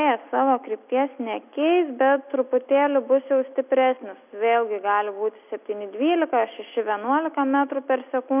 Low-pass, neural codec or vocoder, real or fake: 3.6 kHz; none; real